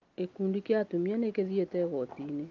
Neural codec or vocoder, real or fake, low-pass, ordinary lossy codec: none; real; 7.2 kHz; Opus, 24 kbps